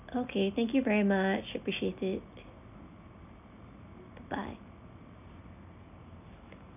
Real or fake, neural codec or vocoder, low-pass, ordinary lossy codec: real; none; 3.6 kHz; none